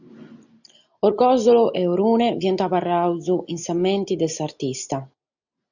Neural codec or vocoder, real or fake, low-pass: none; real; 7.2 kHz